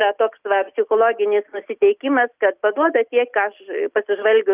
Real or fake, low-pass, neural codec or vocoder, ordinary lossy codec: real; 3.6 kHz; none; Opus, 24 kbps